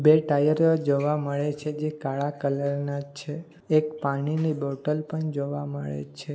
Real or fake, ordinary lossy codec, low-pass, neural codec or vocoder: real; none; none; none